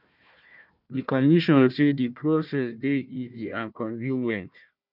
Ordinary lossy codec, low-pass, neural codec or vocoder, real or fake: none; 5.4 kHz; codec, 16 kHz, 1 kbps, FunCodec, trained on Chinese and English, 50 frames a second; fake